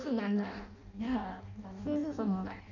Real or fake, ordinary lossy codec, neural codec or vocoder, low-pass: fake; none; codec, 16 kHz in and 24 kHz out, 0.6 kbps, FireRedTTS-2 codec; 7.2 kHz